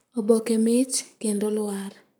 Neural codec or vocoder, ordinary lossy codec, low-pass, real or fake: codec, 44.1 kHz, 7.8 kbps, Pupu-Codec; none; none; fake